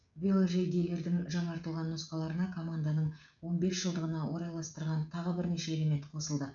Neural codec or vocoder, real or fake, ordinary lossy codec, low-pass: codec, 16 kHz, 16 kbps, FreqCodec, smaller model; fake; none; 7.2 kHz